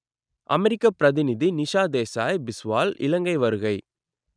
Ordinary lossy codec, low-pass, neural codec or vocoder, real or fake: none; 9.9 kHz; none; real